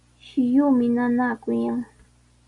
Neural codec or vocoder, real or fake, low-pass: none; real; 10.8 kHz